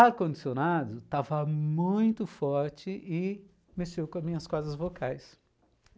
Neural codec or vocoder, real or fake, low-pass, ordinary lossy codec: none; real; none; none